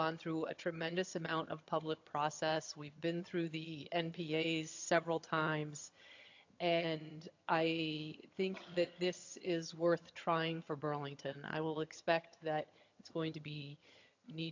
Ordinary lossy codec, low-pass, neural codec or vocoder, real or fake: AAC, 48 kbps; 7.2 kHz; vocoder, 22.05 kHz, 80 mel bands, HiFi-GAN; fake